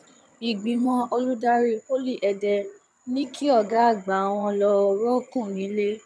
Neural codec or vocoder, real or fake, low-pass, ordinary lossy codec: vocoder, 22.05 kHz, 80 mel bands, HiFi-GAN; fake; none; none